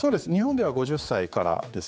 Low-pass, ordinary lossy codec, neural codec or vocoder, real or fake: none; none; codec, 16 kHz, 4 kbps, X-Codec, HuBERT features, trained on general audio; fake